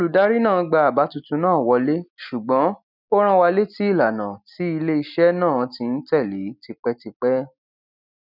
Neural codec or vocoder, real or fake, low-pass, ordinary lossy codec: none; real; 5.4 kHz; none